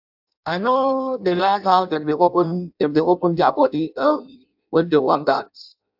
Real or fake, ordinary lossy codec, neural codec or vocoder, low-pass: fake; AAC, 48 kbps; codec, 16 kHz in and 24 kHz out, 0.6 kbps, FireRedTTS-2 codec; 5.4 kHz